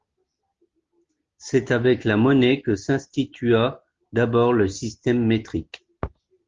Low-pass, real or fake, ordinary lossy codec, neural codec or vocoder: 7.2 kHz; real; Opus, 32 kbps; none